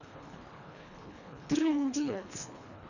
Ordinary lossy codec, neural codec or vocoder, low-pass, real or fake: none; codec, 24 kHz, 1.5 kbps, HILCodec; 7.2 kHz; fake